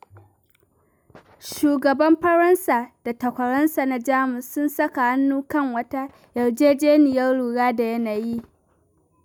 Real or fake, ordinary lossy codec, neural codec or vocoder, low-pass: real; none; none; none